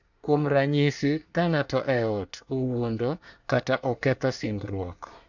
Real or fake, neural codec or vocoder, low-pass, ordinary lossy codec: fake; codec, 24 kHz, 1 kbps, SNAC; 7.2 kHz; none